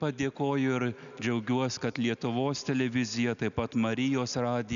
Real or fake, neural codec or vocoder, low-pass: real; none; 7.2 kHz